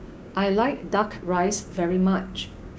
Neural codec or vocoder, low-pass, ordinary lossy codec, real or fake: codec, 16 kHz, 6 kbps, DAC; none; none; fake